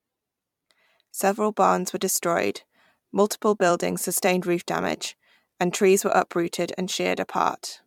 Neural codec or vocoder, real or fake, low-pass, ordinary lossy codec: vocoder, 44.1 kHz, 128 mel bands every 256 samples, BigVGAN v2; fake; 19.8 kHz; MP3, 96 kbps